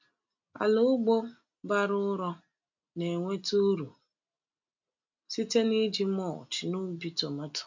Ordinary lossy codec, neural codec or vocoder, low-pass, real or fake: MP3, 64 kbps; none; 7.2 kHz; real